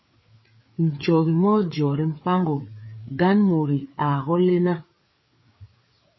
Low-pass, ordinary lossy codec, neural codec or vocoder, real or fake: 7.2 kHz; MP3, 24 kbps; codec, 16 kHz, 4 kbps, FreqCodec, larger model; fake